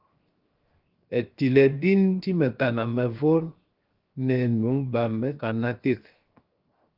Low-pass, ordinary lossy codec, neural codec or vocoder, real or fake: 5.4 kHz; Opus, 32 kbps; codec, 16 kHz, 0.7 kbps, FocalCodec; fake